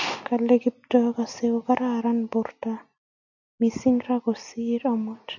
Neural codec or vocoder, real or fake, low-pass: none; real; 7.2 kHz